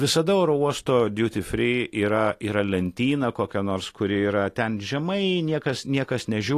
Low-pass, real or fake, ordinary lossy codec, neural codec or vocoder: 14.4 kHz; real; AAC, 48 kbps; none